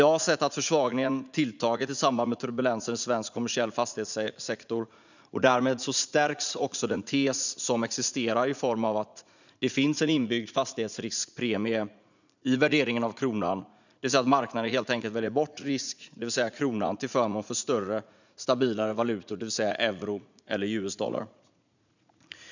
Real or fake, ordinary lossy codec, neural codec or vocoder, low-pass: fake; none; vocoder, 44.1 kHz, 80 mel bands, Vocos; 7.2 kHz